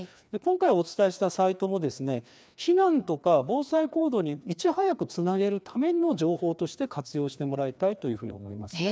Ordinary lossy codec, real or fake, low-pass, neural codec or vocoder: none; fake; none; codec, 16 kHz, 2 kbps, FreqCodec, larger model